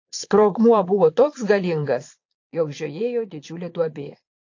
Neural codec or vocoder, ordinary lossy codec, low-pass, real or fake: vocoder, 22.05 kHz, 80 mel bands, Vocos; AAC, 48 kbps; 7.2 kHz; fake